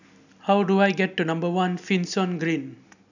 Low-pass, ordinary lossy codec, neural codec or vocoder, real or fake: 7.2 kHz; none; none; real